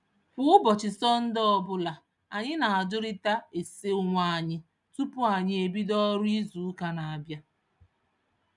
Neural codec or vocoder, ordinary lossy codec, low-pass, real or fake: none; none; 10.8 kHz; real